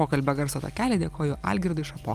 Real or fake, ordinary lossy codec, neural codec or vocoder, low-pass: real; Opus, 32 kbps; none; 14.4 kHz